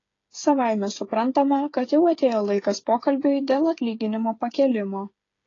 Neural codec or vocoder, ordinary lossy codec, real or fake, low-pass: codec, 16 kHz, 8 kbps, FreqCodec, smaller model; AAC, 32 kbps; fake; 7.2 kHz